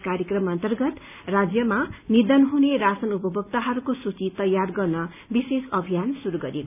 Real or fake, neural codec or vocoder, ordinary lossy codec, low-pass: real; none; none; 3.6 kHz